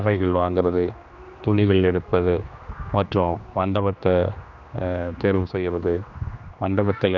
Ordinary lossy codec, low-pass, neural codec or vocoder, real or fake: none; 7.2 kHz; codec, 16 kHz, 2 kbps, X-Codec, HuBERT features, trained on general audio; fake